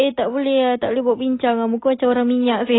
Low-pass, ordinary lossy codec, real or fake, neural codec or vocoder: 7.2 kHz; AAC, 16 kbps; real; none